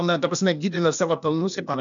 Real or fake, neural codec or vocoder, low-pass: fake; codec, 16 kHz, 0.8 kbps, ZipCodec; 7.2 kHz